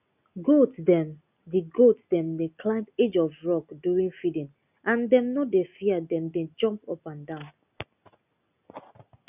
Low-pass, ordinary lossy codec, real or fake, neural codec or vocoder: 3.6 kHz; none; real; none